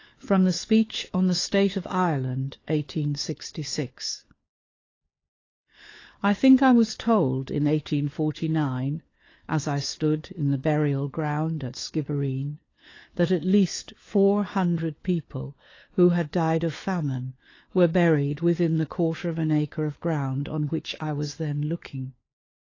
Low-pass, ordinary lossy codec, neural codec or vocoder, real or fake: 7.2 kHz; AAC, 32 kbps; codec, 16 kHz, 4 kbps, FunCodec, trained on LibriTTS, 50 frames a second; fake